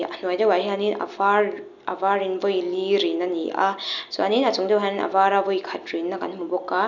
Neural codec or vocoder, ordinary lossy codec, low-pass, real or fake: none; none; 7.2 kHz; real